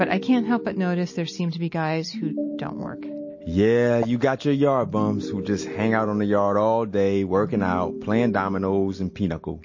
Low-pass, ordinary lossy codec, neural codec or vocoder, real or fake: 7.2 kHz; MP3, 32 kbps; none; real